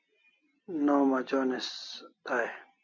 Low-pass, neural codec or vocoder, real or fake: 7.2 kHz; none; real